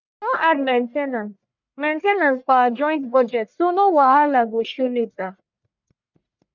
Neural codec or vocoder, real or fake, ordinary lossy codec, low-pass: codec, 44.1 kHz, 1.7 kbps, Pupu-Codec; fake; none; 7.2 kHz